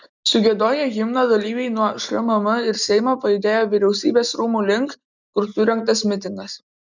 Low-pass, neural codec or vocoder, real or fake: 7.2 kHz; none; real